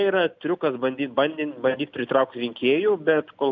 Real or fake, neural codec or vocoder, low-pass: real; none; 7.2 kHz